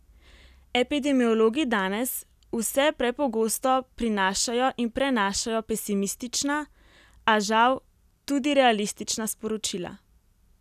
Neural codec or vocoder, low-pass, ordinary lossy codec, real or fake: none; 14.4 kHz; none; real